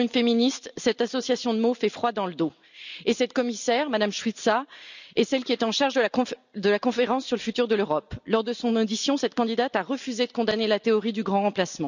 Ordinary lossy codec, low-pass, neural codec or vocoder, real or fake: none; 7.2 kHz; none; real